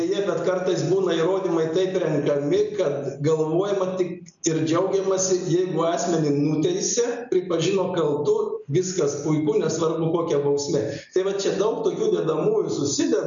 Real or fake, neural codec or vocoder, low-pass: real; none; 7.2 kHz